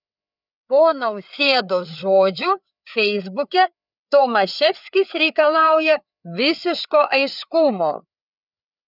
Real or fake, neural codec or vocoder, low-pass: fake; codec, 16 kHz, 4 kbps, FreqCodec, larger model; 5.4 kHz